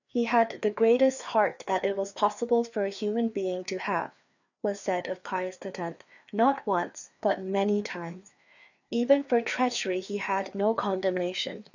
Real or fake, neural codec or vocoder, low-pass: fake; codec, 16 kHz, 2 kbps, FreqCodec, larger model; 7.2 kHz